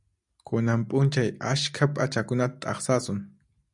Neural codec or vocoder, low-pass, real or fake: none; 10.8 kHz; real